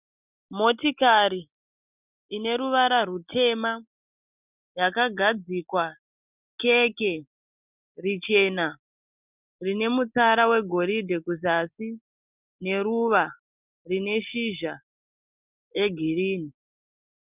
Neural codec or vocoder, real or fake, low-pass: none; real; 3.6 kHz